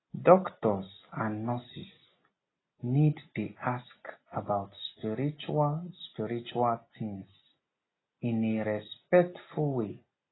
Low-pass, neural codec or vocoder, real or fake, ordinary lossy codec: 7.2 kHz; none; real; AAC, 16 kbps